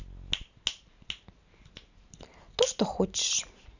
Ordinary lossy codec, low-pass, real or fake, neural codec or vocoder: none; 7.2 kHz; real; none